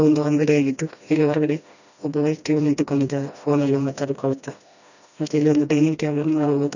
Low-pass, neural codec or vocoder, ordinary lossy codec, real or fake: 7.2 kHz; codec, 16 kHz, 1 kbps, FreqCodec, smaller model; none; fake